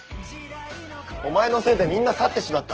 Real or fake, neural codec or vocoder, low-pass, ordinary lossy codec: real; none; 7.2 kHz; Opus, 16 kbps